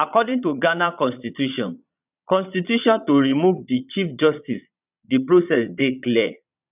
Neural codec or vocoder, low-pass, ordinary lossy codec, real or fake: vocoder, 22.05 kHz, 80 mel bands, Vocos; 3.6 kHz; none; fake